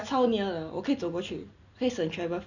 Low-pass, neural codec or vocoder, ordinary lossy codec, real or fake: 7.2 kHz; none; none; real